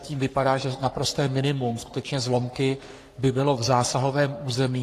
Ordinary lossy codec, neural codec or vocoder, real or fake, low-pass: AAC, 48 kbps; codec, 44.1 kHz, 3.4 kbps, Pupu-Codec; fake; 14.4 kHz